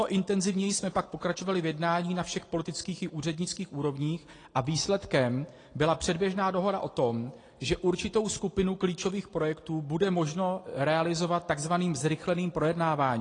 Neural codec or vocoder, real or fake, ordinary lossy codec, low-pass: none; real; AAC, 32 kbps; 9.9 kHz